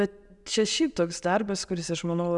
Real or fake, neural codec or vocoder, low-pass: real; none; 10.8 kHz